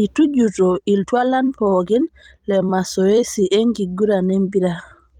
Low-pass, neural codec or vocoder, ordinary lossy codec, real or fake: 19.8 kHz; vocoder, 44.1 kHz, 128 mel bands every 256 samples, BigVGAN v2; Opus, 32 kbps; fake